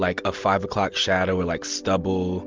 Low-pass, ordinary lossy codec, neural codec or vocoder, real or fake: 7.2 kHz; Opus, 32 kbps; none; real